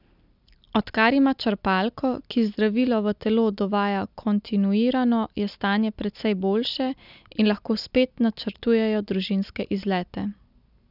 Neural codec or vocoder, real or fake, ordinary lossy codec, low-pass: none; real; none; 5.4 kHz